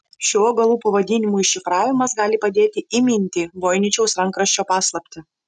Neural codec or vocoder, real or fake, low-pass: none; real; 10.8 kHz